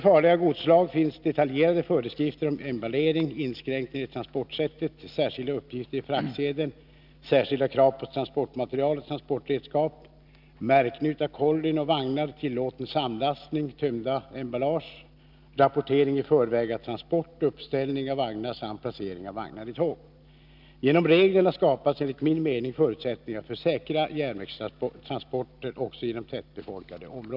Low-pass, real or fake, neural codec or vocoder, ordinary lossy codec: 5.4 kHz; real; none; none